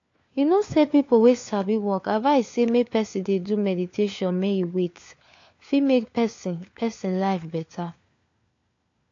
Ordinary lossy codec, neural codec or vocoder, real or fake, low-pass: AAC, 48 kbps; codec, 16 kHz, 4 kbps, FunCodec, trained on LibriTTS, 50 frames a second; fake; 7.2 kHz